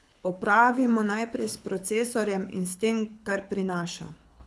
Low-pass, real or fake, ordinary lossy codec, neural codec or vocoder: none; fake; none; codec, 24 kHz, 6 kbps, HILCodec